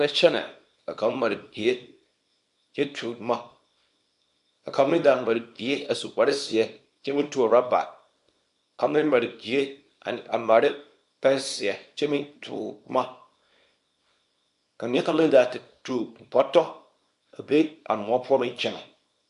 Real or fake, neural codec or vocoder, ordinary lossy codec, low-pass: fake; codec, 24 kHz, 0.9 kbps, WavTokenizer, small release; MP3, 64 kbps; 10.8 kHz